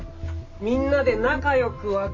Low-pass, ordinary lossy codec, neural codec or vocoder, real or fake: 7.2 kHz; MP3, 32 kbps; none; real